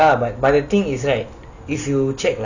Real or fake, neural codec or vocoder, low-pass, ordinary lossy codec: real; none; 7.2 kHz; none